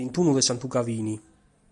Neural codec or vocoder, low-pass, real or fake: none; 10.8 kHz; real